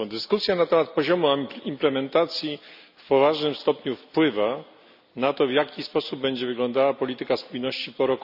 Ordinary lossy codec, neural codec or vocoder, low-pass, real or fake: none; none; 5.4 kHz; real